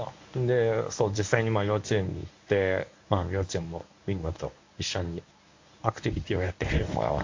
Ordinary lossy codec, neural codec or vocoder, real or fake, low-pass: AAC, 48 kbps; codec, 24 kHz, 0.9 kbps, WavTokenizer, medium speech release version 1; fake; 7.2 kHz